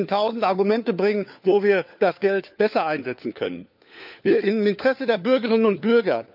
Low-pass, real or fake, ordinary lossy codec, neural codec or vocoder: 5.4 kHz; fake; none; codec, 16 kHz, 4 kbps, FunCodec, trained on LibriTTS, 50 frames a second